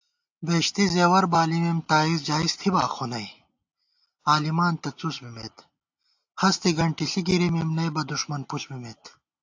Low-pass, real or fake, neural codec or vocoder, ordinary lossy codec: 7.2 kHz; real; none; AAC, 48 kbps